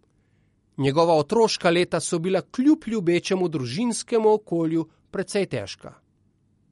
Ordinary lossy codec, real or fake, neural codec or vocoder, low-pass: MP3, 48 kbps; real; none; 19.8 kHz